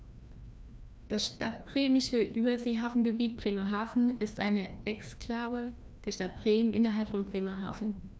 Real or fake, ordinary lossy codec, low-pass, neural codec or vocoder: fake; none; none; codec, 16 kHz, 1 kbps, FreqCodec, larger model